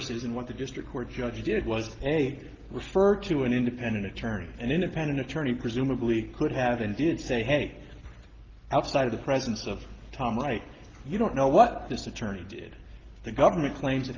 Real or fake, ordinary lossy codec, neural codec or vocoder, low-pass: real; Opus, 16 kbps; none; 7.2 kHz